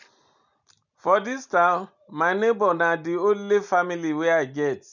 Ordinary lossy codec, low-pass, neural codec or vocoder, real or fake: none; 7.2 kHz; none; real